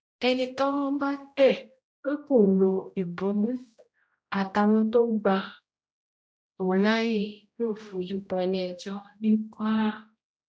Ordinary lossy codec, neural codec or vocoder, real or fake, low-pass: none; codec, 16 kHz, 0.5 kbps, X-Codec, HuBERT features, trained on general audio; fake; none